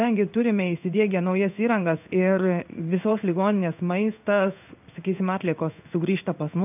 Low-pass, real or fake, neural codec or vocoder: 3.6 kHz; fake; codec, 16 kHz in and 24 kHz out, 1 kbps, XY-Tokenizer